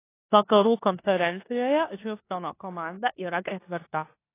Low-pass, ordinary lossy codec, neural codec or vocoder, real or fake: 3.6 kHz; AAC, 24 kbps; codec, 16 kHz in and 24 kHz out, 0.9 kbps, LongCat-Audio-Codec, four codebook decoder; fake